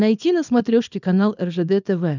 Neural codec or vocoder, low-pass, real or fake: codec, 24 kHz, 0.9 kbps, WavTokenizer, small release; 7.2 kHz; fake